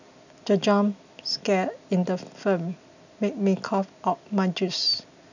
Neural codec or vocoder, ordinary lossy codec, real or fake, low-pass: none; none; real; 7.2 kHz